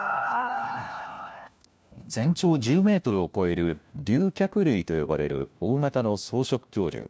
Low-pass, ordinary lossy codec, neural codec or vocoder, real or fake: none; none; codec, 16 kHz, 1 kbps, FunCodec, trained on LibriTTS, 50 frames a second; fake